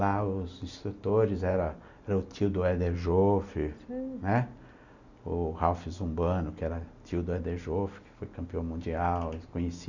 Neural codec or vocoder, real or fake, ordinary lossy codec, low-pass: none; real; none; 7.2 kHz